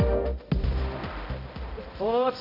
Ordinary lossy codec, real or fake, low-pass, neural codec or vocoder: none; fake; 5.4 kHz; codec, 16 kHz, 0.5 kbps, X-Codec, HuBERT features, trained on balanced general audio